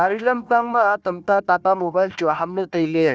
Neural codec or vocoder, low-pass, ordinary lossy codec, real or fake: codec, 16 kHz, 1 kbps, FunCodec, trained on Chinese and English, 50 frames a second; none; none; fake